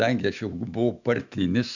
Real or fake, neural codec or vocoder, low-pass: fake; autoencoder, 48 kHz, 128 numbers a frame, DAC-VAE, trained on Japanese speech; 7.2 kHz